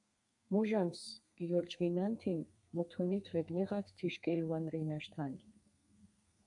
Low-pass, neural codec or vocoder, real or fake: 10.8 kHz; codec, 32 kHz, 1.9 kbps, SNAC; fake